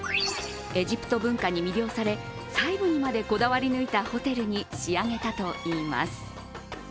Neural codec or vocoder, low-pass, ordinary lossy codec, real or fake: none; none; none; real